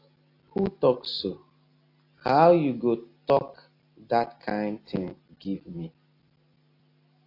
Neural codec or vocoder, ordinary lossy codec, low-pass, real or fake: none; AAC, 32 kbps; 5.4 kHz; real